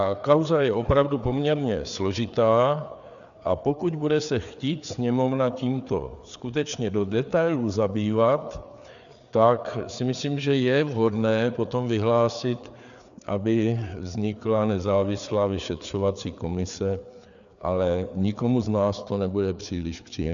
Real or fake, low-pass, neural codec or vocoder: fake; 7.2 kHz; codec, 16 kHz, 4 kbps, FreqCodec, larger model